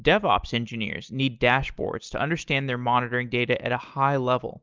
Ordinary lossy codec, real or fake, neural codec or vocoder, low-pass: Opus, 24 kbps; real; none; 7.2 kHz